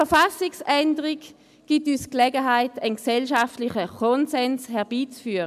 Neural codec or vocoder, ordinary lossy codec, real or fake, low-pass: none; MP3, 96 kbps; real; 14.4 kHz